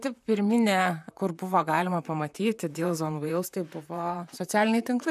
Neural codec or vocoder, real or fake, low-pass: vocoder, 44.1 kHz, 128 mel bands, Pupu-Vocoder; fake; 14.4 kHz